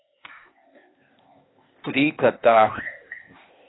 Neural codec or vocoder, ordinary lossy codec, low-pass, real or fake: codec, 16 kHz, 0.8 kbps, ZipCodec; AAC, 16 kbps; 7.2 kHz; fake